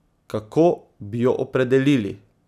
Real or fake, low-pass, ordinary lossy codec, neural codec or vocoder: real; 14.4 kHz; none; none